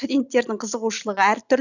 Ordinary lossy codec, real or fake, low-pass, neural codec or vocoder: none; real; 7.2 kHz; none